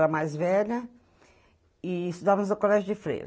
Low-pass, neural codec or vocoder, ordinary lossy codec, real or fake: none; none; none; real